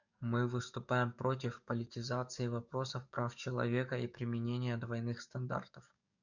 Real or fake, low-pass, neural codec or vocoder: fake; 7.2 kHz; codec, 44.1 kHz, 7.8 kbps, DAC